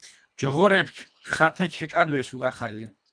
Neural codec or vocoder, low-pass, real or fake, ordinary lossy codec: codec, 24 kHz, 1.5 kbps, HILCodec; 9.9 kHz; fake; MP3, 96 kbps